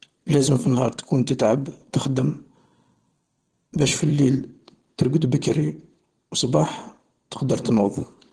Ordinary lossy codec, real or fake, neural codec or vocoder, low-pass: Opus, 16 kbps; fake; vocoder, 22.05 kHz, 80 mel bands, WaveNeXt; 9.9 kHz